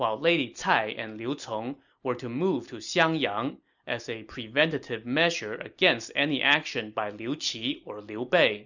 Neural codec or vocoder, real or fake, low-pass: none; real; 7.2 kHz